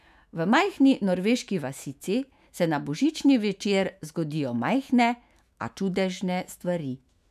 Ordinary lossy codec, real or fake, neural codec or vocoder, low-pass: none; fake; autoencoder, 48 kHz, 128 numbers a frame, DAC-VAE, trained on Japanese speech; 14.4 kHz